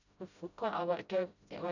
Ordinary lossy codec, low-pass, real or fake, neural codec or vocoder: none; 7.2 kHz; fake; codec, 16 kHz, 0.5 kbps, FreqCodec, smaller model